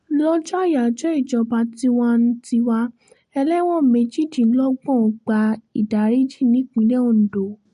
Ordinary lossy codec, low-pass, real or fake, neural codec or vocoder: MP3, 48 kbps; 14.4 kHz; real; none